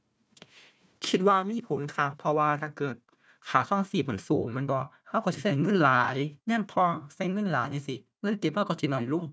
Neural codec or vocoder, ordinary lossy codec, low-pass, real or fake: codec, 16 kHz, 1 kbps, FunCodec, trained on Chinese and English, 50 frames a second; none; none; fake